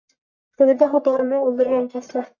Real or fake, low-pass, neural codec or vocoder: fake; 7.2 kHz; codec, 44.1 kHz, 1.7 kbps, Pupu-Codec